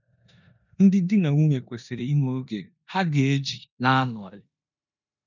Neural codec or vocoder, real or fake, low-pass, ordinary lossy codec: codec, 16 kHz in and 24 kHz out, 0.9 kbps, LongCat-Audio-Codec, four codebook decoder; fake; 7.2 kHz; none